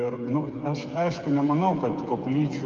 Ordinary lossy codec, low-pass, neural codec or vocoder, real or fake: Opus, 24 kbps; 7.2 kHz; codec, 16 kHz, 8 kbps, FreqCodec, smaller model; fake